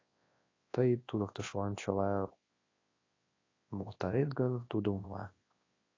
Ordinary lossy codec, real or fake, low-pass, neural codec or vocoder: AAC, 32 kbps; fake; 7.2 kHz; codec, 24 kHz, 0.9 kbps, WavTokenizer, large speech release